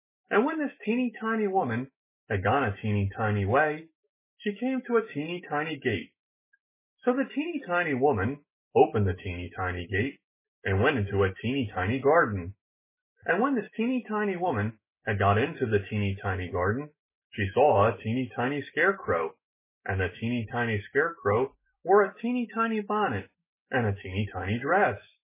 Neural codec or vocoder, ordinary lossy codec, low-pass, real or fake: autoencoder, 48 kHz, 128 numbers a frame, DAC-VAE, trained on Japanese speech; MP3, 16 kbps; 3.6 kHz; fake